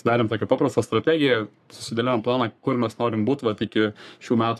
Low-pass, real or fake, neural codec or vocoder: 14.4 kHz; fake; codec, 44.1 kHz, 3.4 kbps, Pupu-Codec